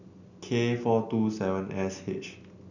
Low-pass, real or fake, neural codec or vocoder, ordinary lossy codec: 7.2 kHz; real; none; none